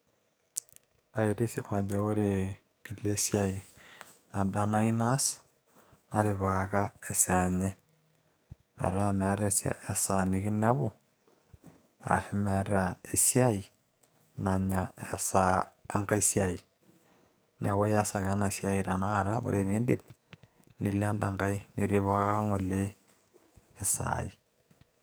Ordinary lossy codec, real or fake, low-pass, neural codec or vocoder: none; fake; none; codec, 44.1 kHz, 2.6 kbps, SNAC